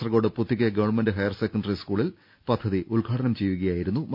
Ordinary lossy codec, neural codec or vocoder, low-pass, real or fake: none; none; 5.4 kHz; real